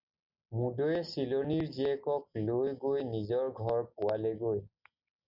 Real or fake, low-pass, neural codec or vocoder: real; 5.4 kHz; none